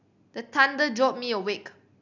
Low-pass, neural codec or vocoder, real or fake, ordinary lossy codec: 7.2 kHz; none; real; none